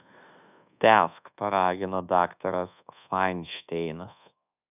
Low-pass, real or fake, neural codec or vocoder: 3.6 kHz; fake; codec, 24 kHz, 1.2 kbps, DualCodec